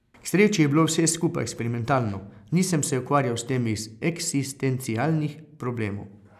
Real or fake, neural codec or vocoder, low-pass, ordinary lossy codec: real; none; 14.4 kHz; none